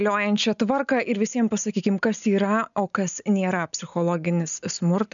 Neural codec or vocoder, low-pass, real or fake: none; 7.2 kHz; real